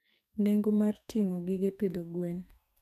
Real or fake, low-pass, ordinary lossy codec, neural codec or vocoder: fake; 14.4 kHz; none; codec, 44.1 kHz, 2.6 kbps, SNAC